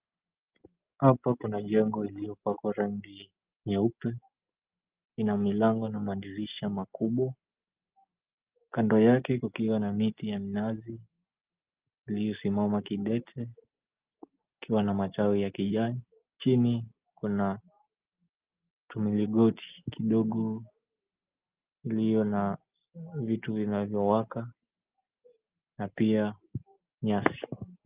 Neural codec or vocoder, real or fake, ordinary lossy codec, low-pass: none; real; Opus, 32 kbps; 3.6 kHz